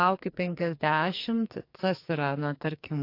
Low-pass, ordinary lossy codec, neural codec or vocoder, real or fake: 5.4 kHz; AAC, 32 kbps; codec, 44.1 kHz, 2.6 kbps, SNAC; fake